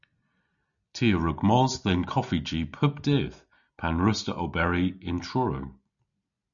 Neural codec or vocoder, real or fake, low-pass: none; real; 7.2 kHz